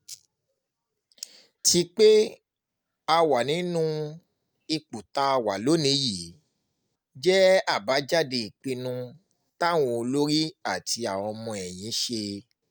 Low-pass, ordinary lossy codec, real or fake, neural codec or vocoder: none; none; real; none